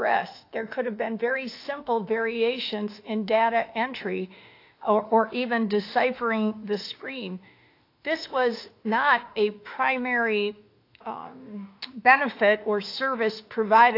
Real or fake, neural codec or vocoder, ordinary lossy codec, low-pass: fake; autoencoder, 48 kHz, 32 numbers a frame, DAC-VAE, trained on Japanese speech; AAC, 32 kbps; 5.4 kHz